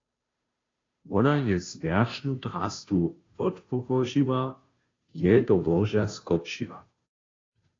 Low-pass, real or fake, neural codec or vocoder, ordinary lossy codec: 7.2 kHz; fake; codec, 16 kHz, 0.5 kbps, FunCodec, trained on Chinese and English, 25 frames a second; AAC, 32 kbps